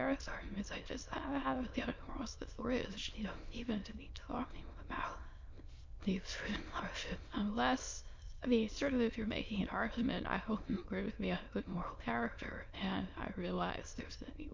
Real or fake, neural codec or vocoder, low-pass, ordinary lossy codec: fake; autoencoder, 22.05 kHz, a latent of 192 numbers a frame, VITS, trained on many speakers; 7.2 kHz; MP3, 48 kbps